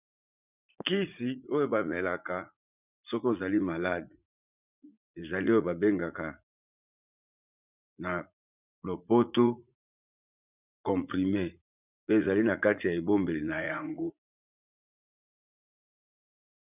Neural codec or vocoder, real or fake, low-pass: vocoder, 44.1 kHz, 80 mel bands, Vocos; fake; 3.6 kHz